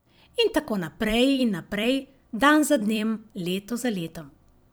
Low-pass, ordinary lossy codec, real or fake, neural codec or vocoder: none; none; fake; vocoder, 44.1 kHz, 128 mel bands every 256 samples, BigVGAN v2